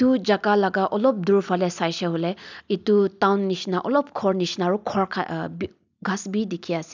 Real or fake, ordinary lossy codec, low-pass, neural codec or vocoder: real; none; 7.2 kHz; none